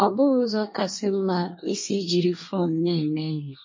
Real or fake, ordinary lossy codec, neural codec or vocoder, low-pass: fake; MP3, 32 kbps; codec, 24 kHz, 1 kbps, SNAC; 7.2 kHz